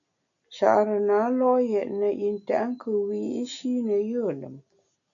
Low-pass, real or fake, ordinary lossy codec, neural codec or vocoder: 7.2 kHz; real; MP3, 96 kbps; none